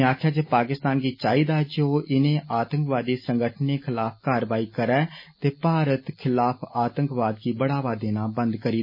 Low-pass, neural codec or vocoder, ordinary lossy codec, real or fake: 5.4 kHz; none; MP3, 24 kbps; real